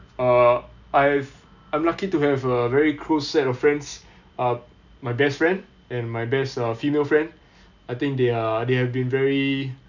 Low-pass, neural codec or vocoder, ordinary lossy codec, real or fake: 7.2 kHz; none; none; real